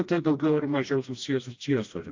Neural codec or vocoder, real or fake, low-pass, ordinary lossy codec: codec, 16 kHz, 1 kbps, FreqCodec, smaller model; fake; 7.2 kHz; AAC, 48 kbps